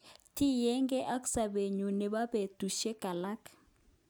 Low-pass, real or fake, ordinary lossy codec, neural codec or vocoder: none; real; none; none